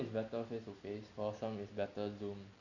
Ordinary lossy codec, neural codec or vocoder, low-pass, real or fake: none; none; 7.2 kHz; real